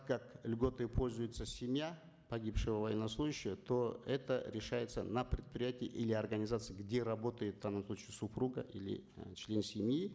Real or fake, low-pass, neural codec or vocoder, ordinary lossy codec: real; none; none; none